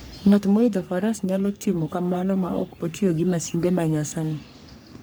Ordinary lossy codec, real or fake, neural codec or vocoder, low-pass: none; fake; codec, 44.1 kHz, 3.4 kbps, Pupu-Codec; none